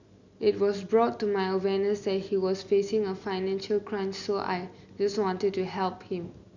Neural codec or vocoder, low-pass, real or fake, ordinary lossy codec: none; 7.2 kHz; real; none